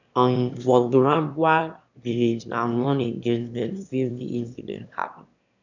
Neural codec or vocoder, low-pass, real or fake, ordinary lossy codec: autoencoder, 22.05 kHz, a latent of 192 numbers a frame, VITS, trained on one speaker; 7.2 kHz; fake; none